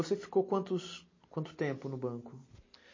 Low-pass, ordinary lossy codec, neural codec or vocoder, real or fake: 7.2 kHz; MP3, 32 kbps; none; real